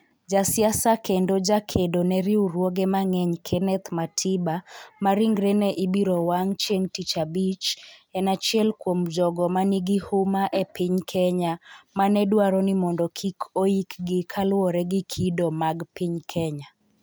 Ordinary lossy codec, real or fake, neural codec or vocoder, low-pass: none; real; none; none